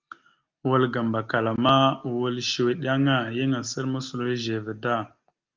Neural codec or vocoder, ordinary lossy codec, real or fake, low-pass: none; Opus, 32 kbps; real; 7.2 kHz